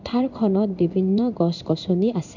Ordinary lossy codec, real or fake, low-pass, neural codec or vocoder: none; fake; 7.2 kHz; codec, 16 kHz in and 24 kHz out, 1 kbps, XY-Tokenizer